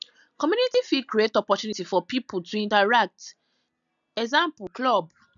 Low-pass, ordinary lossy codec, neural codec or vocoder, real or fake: 7.2 kHz; none; none; real